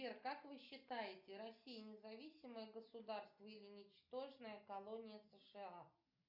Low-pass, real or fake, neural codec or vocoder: 5.4 kHz; real; none